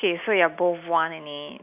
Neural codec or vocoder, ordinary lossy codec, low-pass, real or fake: none; none; 3.6 kHz; real